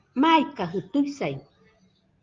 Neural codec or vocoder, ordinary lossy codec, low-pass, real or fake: none; Opus, 32 kbps; 7.2 kHz; real